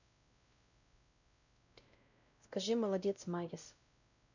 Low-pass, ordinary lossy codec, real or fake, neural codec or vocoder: 7.2 kHz; none; fake; codec, 16 kHz, 0.5 kbps, X-Codec, WavLM features, trained on Multilingual LibriSpeech